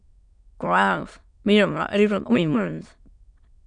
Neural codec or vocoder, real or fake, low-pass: autoencoder, 22.05 kHz, a latent of 192 numbers a frame, VITS, trained on many speakers; fake; 9.9 kHz